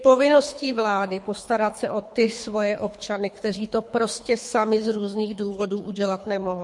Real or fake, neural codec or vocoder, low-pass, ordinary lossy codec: fake; codec, 24 kHz, 3 kbps, HILCodec; 10.8 kHz; MP3, 48 kbps